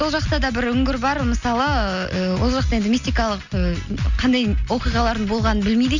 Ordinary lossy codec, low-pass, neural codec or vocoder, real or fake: none; 7.2 kHz; none; real